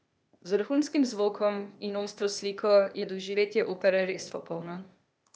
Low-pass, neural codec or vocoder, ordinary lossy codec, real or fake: none; codec, 16 kHz, 0.8 kbps, ZipCodec; none; fake